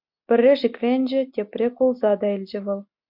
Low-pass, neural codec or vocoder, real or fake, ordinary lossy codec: 5.4 kHz; none; real; MP3, 48 kbps